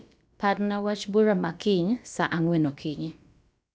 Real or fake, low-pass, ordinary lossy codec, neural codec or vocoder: fake; none; none; codec, 16 kHz, about 1 kbps, DyCAST, with the encoder's durations